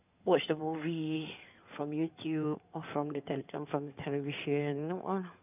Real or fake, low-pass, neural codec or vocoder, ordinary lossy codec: fake; 3.6 kHz; codec, 16 kHz in and 24 kHz out, 2.2 kbps, FireRedTTS-2 codec; none